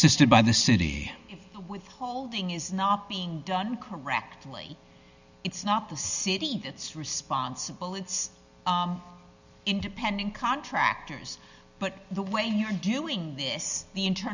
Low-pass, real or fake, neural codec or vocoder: 7.2 kHz; real; none